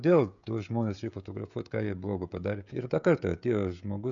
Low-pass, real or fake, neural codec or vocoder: 7.2 kHz; fake; codec, 16 kHz, 16 kbps, FreqCodec, smaller model